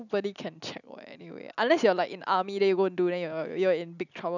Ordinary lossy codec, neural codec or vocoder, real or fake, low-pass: none; none; real; 7.2 kHz